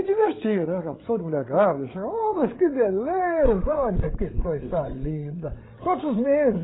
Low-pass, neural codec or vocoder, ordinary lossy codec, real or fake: 7.2 kHz; codec, 16 kHz, 8 kbps, FreqCodec, larger model; AAC, 16 kbps; fake